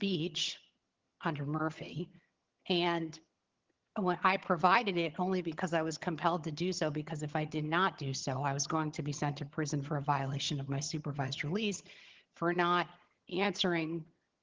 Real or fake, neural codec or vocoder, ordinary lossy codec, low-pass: fake; vocoder, 22.05 kHz, 80 mel bands, HiFi-GAN; Opus, 16 kbps; 7.2 kHz